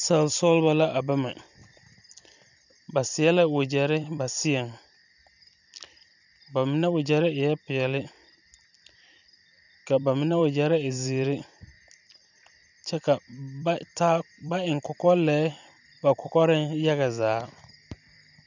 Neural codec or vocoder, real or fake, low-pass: vocoder, 44.1 kHz, 128 mel bands every 256 samples, BigVGAN v2; fake; 7.2 kHz